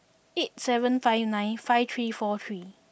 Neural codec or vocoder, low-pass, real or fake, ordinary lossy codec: none; none; real; none